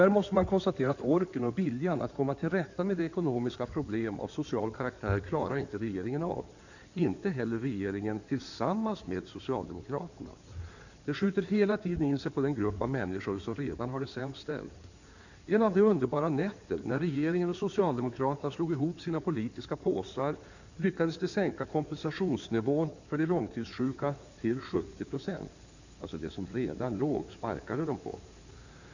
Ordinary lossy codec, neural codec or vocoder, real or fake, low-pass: none; codec, 16 kHz in and 24 kHz out, 2.2 kbps, FireRedTTS-2 codec; fake; 7.2 kHz